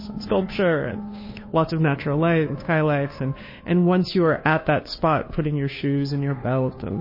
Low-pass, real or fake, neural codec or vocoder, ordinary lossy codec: 5.4 kHz; fake; codec, 16 kHz, 2 kbps, FunCodec, trained on Chinese and English, 25 frames a second; MP3, 24 kbps